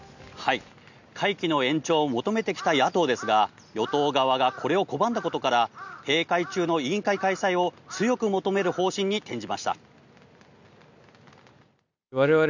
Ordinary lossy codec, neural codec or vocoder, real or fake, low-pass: none; none; real; 7.2 kHz